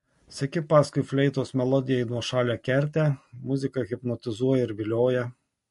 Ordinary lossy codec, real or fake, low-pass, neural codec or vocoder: MP3, 48 kbps; real; 14.4 kHz; none